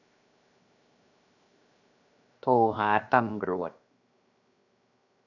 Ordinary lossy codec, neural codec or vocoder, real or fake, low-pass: none; codec, 16 kHz, 0.7 kbps, FocalCodec; fake; 7.2 kHz